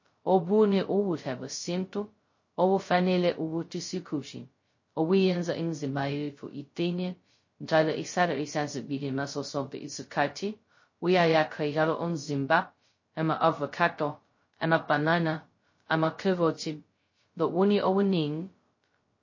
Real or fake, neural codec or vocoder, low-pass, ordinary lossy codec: fake; codec, 16 kHz, 0.2 kbps, FocalCodec; 7.2 kHz; MP3, 32 kbps